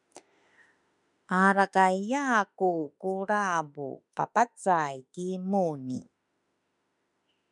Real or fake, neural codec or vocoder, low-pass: fake; autoencoder, 48 kHz, 32 numbers a frame, DAC-VAE, trained on Japanese speech; 10.8 kHz